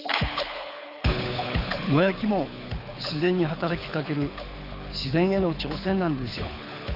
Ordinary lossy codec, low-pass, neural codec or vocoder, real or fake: Opus, 64 kbps; 5.4 kHz; codec, 24 kHz, 6 kbps, HILCodec; fake